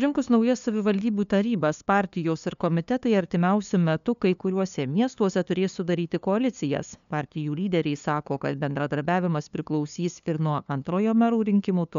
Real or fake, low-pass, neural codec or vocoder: fake; 7.2 kHz; codec, 16 kHz, 2 kbps, FunCodec, trained on LibriTTS, 25 frames a second